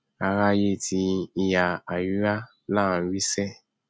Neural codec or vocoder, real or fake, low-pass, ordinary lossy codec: none; real; none; none